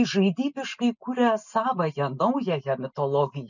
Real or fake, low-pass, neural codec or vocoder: real; 7.2 kHz; none